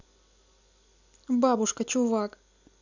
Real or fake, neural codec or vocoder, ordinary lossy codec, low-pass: real; none; none; 7.2 kHz